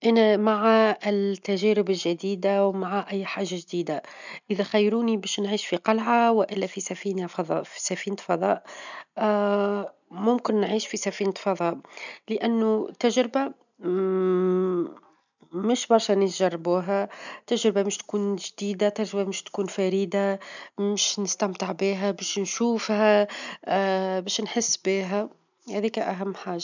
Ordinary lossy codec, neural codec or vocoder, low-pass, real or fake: none; none; 7.2 kHz; real